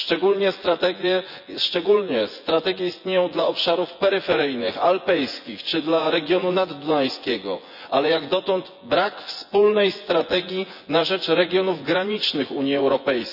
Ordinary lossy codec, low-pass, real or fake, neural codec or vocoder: none; 5.4 kHz; fake; vocoder, 24 kHz, 100 mel bands, Vocos